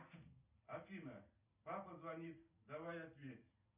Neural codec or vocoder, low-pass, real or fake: none; 3.6 kHz; real